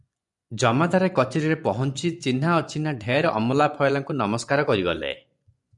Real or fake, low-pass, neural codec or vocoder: fake; 10.8 kHz; vocoder, 24 kHz, 100 mel bands, Vocos